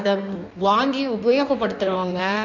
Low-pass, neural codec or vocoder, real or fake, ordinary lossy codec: 7.2 kHz; codec, 16 kHz in and 24 kHz out, 1.1 kbps, FireRedTTS-2 codec; fake; none